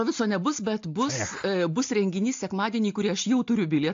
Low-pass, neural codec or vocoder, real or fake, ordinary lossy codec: 7.2 kHz; none; real; AAC, 48 kbps